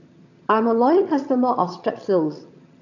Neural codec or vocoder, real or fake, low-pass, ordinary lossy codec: vocoder, 22.05 kHz, 80 mel bands, HiFi-GAN; fake; 7.2 kHz; AAC, 48 kbps